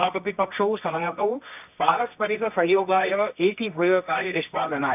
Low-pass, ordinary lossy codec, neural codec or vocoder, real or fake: 3.6 kHz; none; codec, 24 kHz, 0.9 kbps, WavTokenizer, medium music audio release; fake